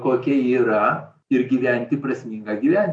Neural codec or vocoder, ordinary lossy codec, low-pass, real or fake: none; MP3, 48 kbps; 9.9 kHz; real